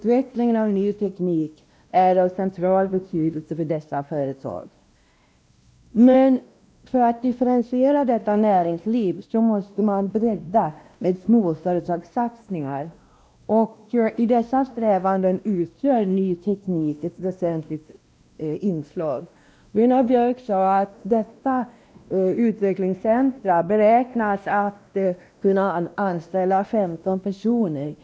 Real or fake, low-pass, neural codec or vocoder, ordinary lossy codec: fake; none; codec, 16 kHz, 1 kbps, X-Codec, WavLM features, trained on Multilingual LibriSpeech; none